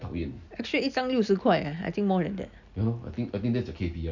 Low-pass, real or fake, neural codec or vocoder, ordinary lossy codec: 7.2 kHz; real; none; none